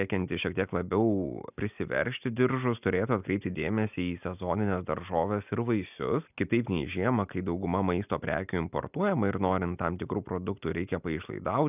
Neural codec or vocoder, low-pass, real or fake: none; 3.6 kHz; real